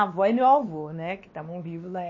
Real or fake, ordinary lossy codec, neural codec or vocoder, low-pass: fake; MP3, 32 kbps; codec, 16 kHz, 2 kbps, X-Codec, WavLM features, trained on Multilingual LibriSpeech; 7.2 kHz